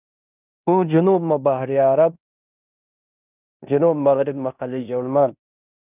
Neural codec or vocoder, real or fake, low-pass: codec, 16 kHz in and 24 kHz out, 0.9 kbps, LongCat-Audio-Codec, fine tuned four codebook decoder; fake; 3.6 kHz